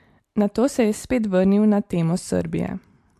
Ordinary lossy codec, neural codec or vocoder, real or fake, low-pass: MP3, 64 kbps; none; real; 14.4 kHz